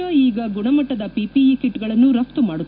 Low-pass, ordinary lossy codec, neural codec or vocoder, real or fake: 5.4 kHz; none; none; real